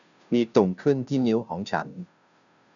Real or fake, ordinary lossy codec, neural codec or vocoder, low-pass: fake; none; codec, 16 kHz, 0.5 kbps, FunCodec, trained on Chinese and English, 25 frames a second; 7.2 kHz